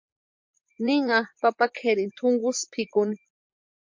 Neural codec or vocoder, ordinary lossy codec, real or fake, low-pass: vocoder, 44.1 kHz, 128 mel bands, Pupu-Vocoder; MP3, 48 kbps; fake; 7.2 kHz